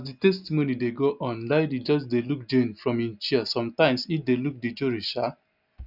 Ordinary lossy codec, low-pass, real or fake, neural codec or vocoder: none; 5.4 kHz; real; none